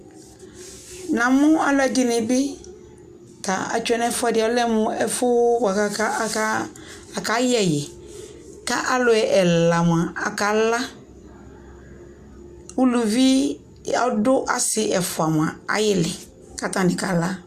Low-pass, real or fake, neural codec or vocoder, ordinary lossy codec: 14.4 kHz; real; none; MP3, 96 kbps